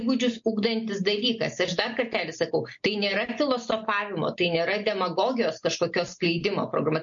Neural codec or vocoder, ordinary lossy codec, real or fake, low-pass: none; MP3, 48 kbps; real; 7.2 kHz